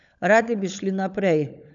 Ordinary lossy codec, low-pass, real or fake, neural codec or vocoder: none; 7.2 kHz; fake; codec, 16 kHz, 16 kbps, FunCodec, trained on LibriTTS, 50 frames a second